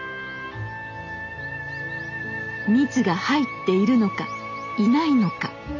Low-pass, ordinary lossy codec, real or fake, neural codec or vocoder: 7.2 kHz; none; real; none